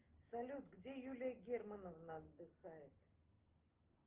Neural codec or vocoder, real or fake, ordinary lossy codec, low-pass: none; real; Opus, 16 kbps; 3.6 kHz